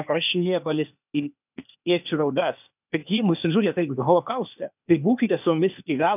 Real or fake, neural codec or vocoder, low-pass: fake; codec, 16 kHz, 0.8 kbps, ZipCodec; 3.6 kHz